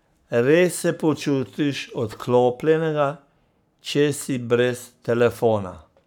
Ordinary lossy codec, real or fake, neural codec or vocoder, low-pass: none; fake; codec, 44.1 kHz, 7.8 kbps, Pupu-Codec; 19.8 kHz